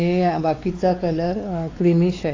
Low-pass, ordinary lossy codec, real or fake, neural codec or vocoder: 7.2 kHz; AAC, 32 kbps; fake; codec, 16 kHz, 4 kbps, X-Codec, WavLM features, trained on Multilingual LibriSpeech